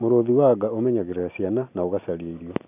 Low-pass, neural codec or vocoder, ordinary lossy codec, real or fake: 3.6 kHz; none; none; real